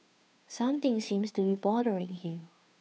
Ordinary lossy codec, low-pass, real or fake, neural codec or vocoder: none; none; fake; codec, 16 kHz, 2 kbps, FunCodec, trained on Chinese and English, 25 frames a second